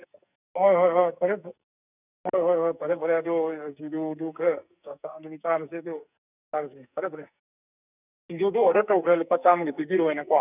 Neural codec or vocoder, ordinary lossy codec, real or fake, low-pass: codec, 44.1 kHz, 2.6 kbps, SNAC; none; fake; 3.6 kHz